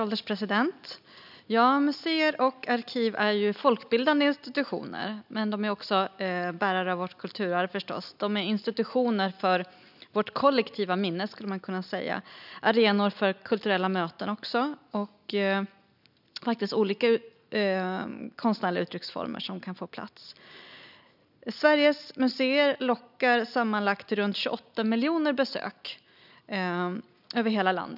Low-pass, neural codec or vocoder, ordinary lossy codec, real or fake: 5.4 kHz; none; none; real